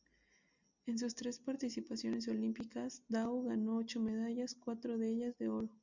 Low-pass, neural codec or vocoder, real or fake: 7.2 kHz; none; real